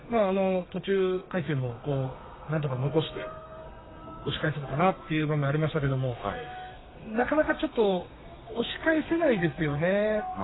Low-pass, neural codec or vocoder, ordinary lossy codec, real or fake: 7.2 kHz; codec, 32 kHz, 1.9 kbps, SNAC; AAC, 16 kbps; fake